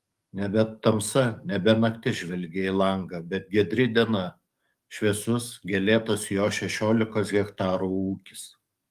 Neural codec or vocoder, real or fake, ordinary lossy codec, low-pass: codec, 44.1 kHz, 7.8 kbps, DAC; fake; Opus, 32 kbps; 14.4 kHz